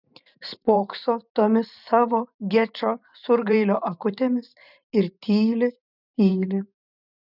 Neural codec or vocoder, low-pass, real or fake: vocoder, 44.1 kHz, 128 mel bands every 512 samples, BigVGAN v2; 5.4 kHz; fake